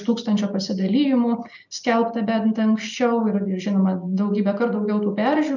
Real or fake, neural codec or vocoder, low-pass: real; none; 7.2 kHz